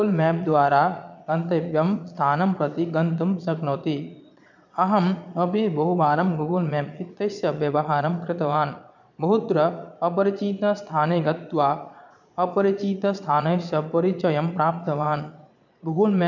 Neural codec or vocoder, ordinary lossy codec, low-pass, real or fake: vocoder, 22.05 kHz, 80 mel bands, Vocos; none; 7.2 kHz; fake